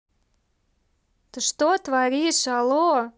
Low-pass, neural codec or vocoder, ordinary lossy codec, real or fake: none; none; none; real